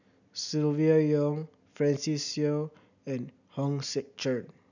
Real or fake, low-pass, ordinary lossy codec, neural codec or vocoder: real; 7.2 kHz; none; none